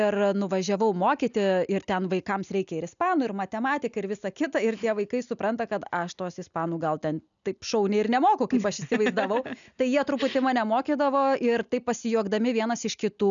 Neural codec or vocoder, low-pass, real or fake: none; 7.2 kHz; real